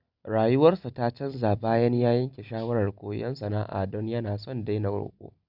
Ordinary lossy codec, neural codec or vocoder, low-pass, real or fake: none; none; 5.4 kHz; real